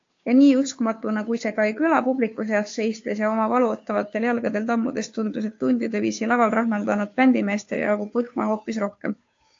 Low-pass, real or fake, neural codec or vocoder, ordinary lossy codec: 7.2 kHz; fake; codec, 16 kHz, 2 kbps, FunCodec, trained on Chinese and English, 25 frames a second; AAC, 48 kbps